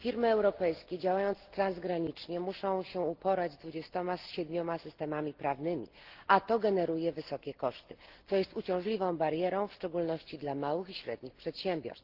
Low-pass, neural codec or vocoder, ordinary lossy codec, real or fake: 5.4 kHz; none; Opus, 16 kbps; real